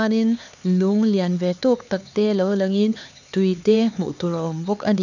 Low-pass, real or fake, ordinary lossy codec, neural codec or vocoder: 7.2 kHz; fake; none; codec, 16 kHz, 4 kbps, X-Codec, HuBERT features, trained on LibriSpeech